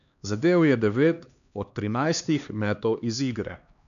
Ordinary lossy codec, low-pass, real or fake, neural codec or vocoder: none; 7.2 kHz; fake; codec, 16 kHz, 2 kbps, X-Codec, HuBERT features, trained on LibriSpeech